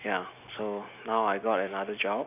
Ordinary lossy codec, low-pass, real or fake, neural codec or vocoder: none; 3.6 kHz; real; none